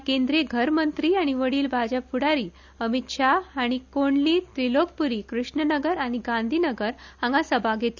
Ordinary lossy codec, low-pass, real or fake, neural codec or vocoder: none; 7.2 kHz; real; none